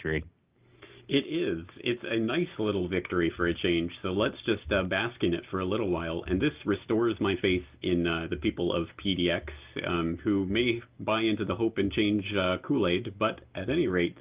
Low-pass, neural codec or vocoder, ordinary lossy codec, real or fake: 3.6 kHz; none; Opus, 24 kbps; real